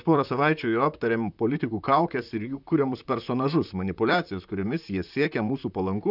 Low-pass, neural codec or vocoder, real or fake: 5.4 kHz; vocoder, 44.1 kHz, 128 mel bands, Pupu-Vocoder; fake